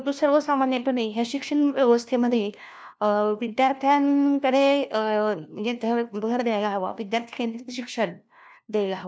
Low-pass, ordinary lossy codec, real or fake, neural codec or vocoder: none; none; fake; codec, 16 kHz, 1 kbps, FunCodec, trained on LibriTTS, 50 frames a second